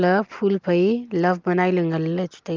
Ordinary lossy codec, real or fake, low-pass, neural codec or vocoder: Opus, 32 kbps; real; 7.2 kHz; none